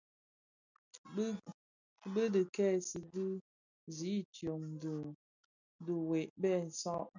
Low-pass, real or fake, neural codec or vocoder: 7.2 kHz; real; none